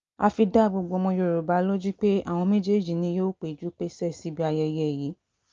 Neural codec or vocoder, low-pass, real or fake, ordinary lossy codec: none; 7.2 kHz; real; Opus, 24 kbps